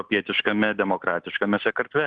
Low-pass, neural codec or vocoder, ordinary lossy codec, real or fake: 9.9 kHz; none; Opus, 24 kbps; real